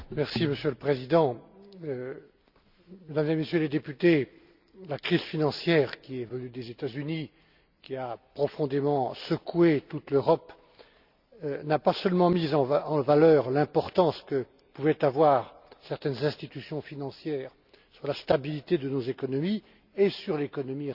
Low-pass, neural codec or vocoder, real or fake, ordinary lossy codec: 5.4 kHz; none; real; Opus, 64 kbps